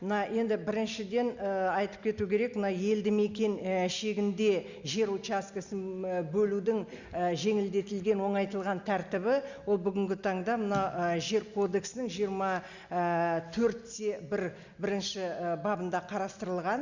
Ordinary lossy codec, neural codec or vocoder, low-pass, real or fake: Opus, 64 kbps; none; 7.2 kHz; real